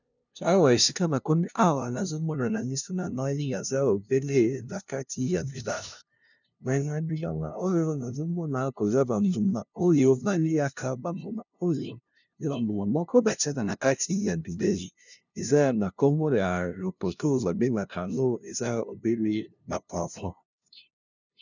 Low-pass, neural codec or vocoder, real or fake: 7.2 kHz; codec, 16 kHz, 0.5 kbps, FunCodec, trained on LibriTTS, 25 frames a second; fake